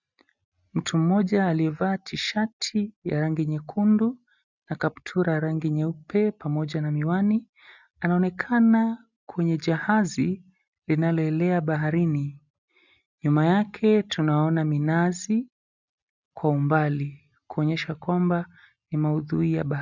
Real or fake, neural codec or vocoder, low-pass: real; none; 7.2 kHz